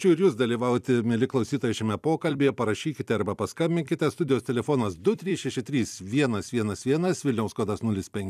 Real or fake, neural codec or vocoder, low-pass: fake; vocoder, 44.1 kHz, 128 mel bands every 256 samples, BigVGAN v2; 14.4 kHz